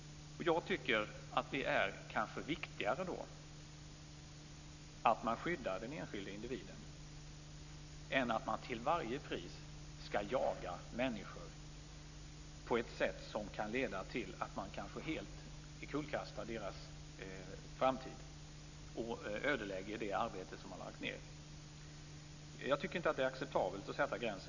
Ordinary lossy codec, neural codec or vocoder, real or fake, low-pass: none; none; real; 7.2 kHz